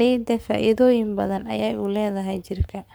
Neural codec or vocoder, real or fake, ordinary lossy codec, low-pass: codec, 44.1 kHz, 7.8 kbps, Pupu-Codec; fake; none; none